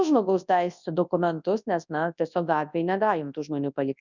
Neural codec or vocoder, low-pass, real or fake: codec, 24 kHz, 0.9 kbps, WavTokenizer, large speech release; 7.2 kHz; fake